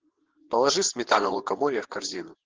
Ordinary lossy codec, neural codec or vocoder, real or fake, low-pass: Opus, 16 kbps; vocoder, 22.05 kHz, 80 mel bands, WaveNeXt; fake; 7.2 kHz